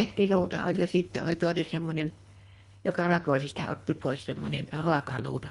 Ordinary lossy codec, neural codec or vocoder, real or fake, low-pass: Opus, 64 kbps; codec, 24 kHz, 1.5 kbps, HILCodec; fake; 10.8 kHz